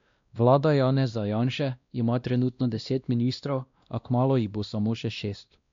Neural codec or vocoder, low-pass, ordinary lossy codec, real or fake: codec, 16 kHz, 1 kbps, X-Codec, WavLM features, trained on Multilingual LibriSpeech; 7.2 kHz; MP3, 64 kbps; fake